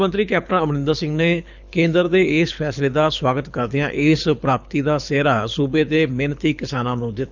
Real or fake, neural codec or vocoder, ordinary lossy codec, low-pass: fake; codec, 24 kHz, 6 kbps, HILCodec; none; 7.2 kHz